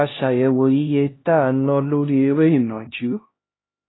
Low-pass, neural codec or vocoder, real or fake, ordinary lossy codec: 7.2 kHz; codec, 16 kHz, 1 kbps, X-Codec, HuBERT features, trained on LibriSpeech; fake; AAC, 16 kbps